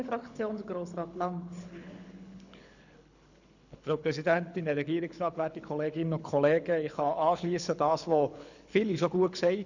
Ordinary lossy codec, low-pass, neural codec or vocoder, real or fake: none; 7.2 kHz; codec, 24 kHz, 6 kbps, HILCodec; fake